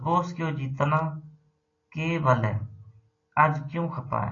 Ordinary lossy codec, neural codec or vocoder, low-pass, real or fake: AAC, 32 kbps; none; 7.2 kHz; real